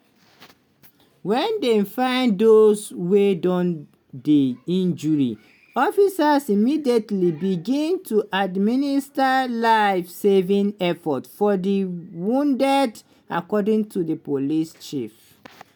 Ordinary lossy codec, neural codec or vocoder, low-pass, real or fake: none; none; none; real